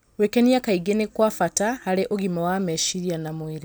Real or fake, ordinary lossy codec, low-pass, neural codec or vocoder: real; none; none; none